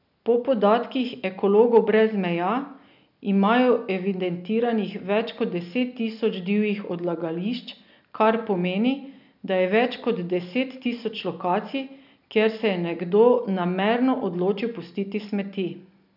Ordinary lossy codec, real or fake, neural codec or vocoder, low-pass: none; real; none; 5.4 kHz